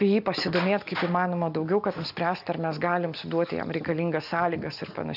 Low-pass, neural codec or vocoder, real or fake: 5.4 kHz; none; real